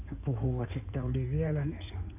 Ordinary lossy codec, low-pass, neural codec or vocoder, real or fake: none; 3.6 kHz; codec, 16 kHz in and 24 kHz out, 2.2 kbps, FireRedTTS-2 codec; fake